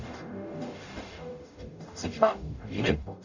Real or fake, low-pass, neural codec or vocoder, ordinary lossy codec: fake; 7.2 kHz; codec, 44.1 kHz, 0.9 kbps, DAC; none